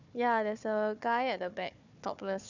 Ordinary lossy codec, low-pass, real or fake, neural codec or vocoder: none; 7.2 kHz; fake; codec, 16 kHz, 4 kbps, FunCodec, trained on Chinese and English, 50 frames a second